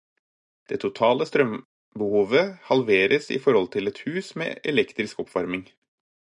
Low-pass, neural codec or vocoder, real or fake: 10.8 kHz; none; real